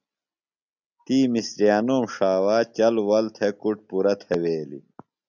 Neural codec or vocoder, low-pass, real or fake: none; 7.2 kHz; real